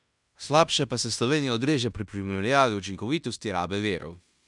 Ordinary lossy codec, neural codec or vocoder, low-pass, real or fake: none; codec, 16 kHz in and 24 kHz out, 0.9 kbps, LongCat-Audio-Codec, fine tuned four codebook decoder; 10.8 kHz; fake